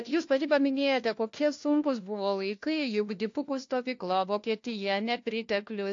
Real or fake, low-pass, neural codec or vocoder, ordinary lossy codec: fake; 7.2 kHz; codec, 16 kHz, 1 kbps, FunCodec, trained on LibriTTS, 50 frames a second; AAC, 48 kbps